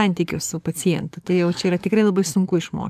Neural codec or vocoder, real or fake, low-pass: codec, 44.1 kHz, 7.8 kbps, DAC; fake; 14.4 kHz